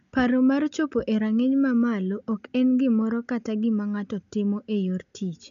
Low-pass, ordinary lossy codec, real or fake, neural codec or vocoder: 7.2 kHz; none; real; none